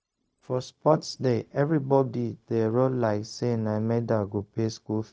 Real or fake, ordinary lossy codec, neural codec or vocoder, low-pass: fake; none; codec, 16 kHz, 0.4 kbps, LongCat-Audio-Codec; none